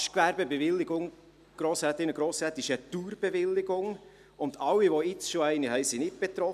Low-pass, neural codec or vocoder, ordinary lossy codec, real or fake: 14.4 kHz; none; none; real